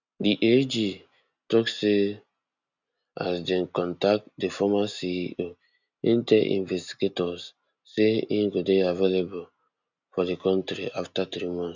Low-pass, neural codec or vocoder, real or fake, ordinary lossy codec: 7.2 kHz; none; real; none